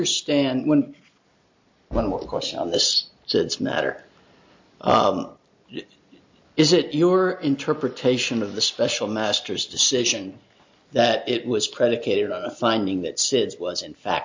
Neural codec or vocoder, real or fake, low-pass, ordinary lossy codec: none; real; 7.2 kHz; MP3, 48 kbps